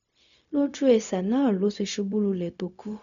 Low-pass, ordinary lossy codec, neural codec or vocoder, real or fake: 7.2 kHz; none; codec, 16 kHz, 0.4 kbps, LongCat-Audio-Codec; fake